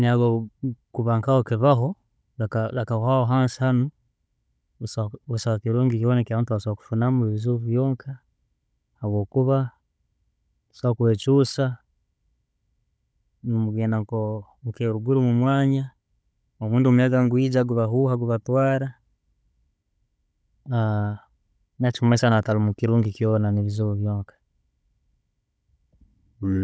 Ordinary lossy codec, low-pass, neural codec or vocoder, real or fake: none; none; codec, 16 kHz, 16 kbps, FunCodec, trained on Chinese and English, 50 frames a second; fake